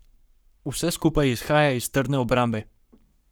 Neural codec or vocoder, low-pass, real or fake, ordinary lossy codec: codec, 44.1 kHz, 7.8 kbps, Pupu-Codec; none; fake; none